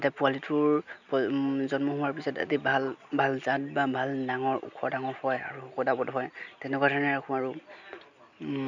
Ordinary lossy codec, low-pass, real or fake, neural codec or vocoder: none; 7.2 kHz; real; none